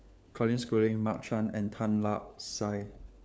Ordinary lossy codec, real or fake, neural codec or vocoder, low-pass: none; fake; codec, 16 kHz, 4 kbps, FunCodec, trained on LibriTTS, 50 frames a second; none